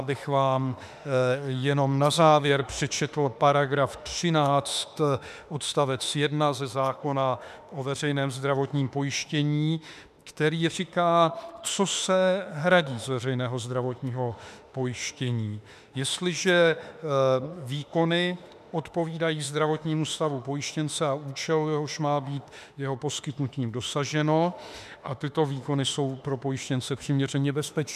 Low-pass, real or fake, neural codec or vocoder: 14.4 kHz; fake; autoencoder, 48 kHz, 32 numbers a frame, DAC-VAE, trained on Japanese speech